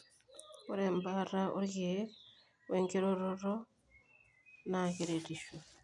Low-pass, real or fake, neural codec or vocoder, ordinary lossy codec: 10.8 kHz; real; none; none